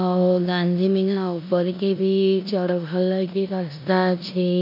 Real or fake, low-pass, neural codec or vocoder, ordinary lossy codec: fake; 5.4 kHz; codec, 16 kHz in and 24 kHz out, 0.9 kbps, LongCat-Audio-Codec, four codebook decoder; none